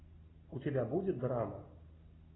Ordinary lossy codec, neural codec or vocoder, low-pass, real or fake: AAC, 16 kbps; none; 7.2 kHz; real